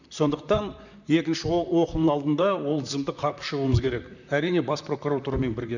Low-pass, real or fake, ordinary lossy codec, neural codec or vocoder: 7.2 kHz; fake; none; vocoder, 44.1 kHz, 128 mel bands, Pupu-Vocoder